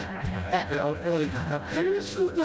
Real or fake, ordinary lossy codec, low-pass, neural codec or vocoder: fake; none; none; codec, 16 kHz, 0.5 kbps, FreqCodec, smaller model